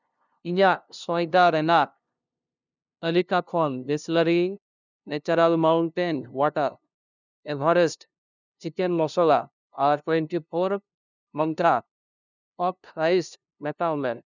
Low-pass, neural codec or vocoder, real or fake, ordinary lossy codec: 7.2 kHz; codec, 16 kHz, 0.5 kbps, FunCodec, trained on LibriTTS, 25 frames a second; fake; none